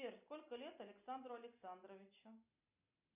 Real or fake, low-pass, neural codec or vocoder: real; 3.6 kHz; none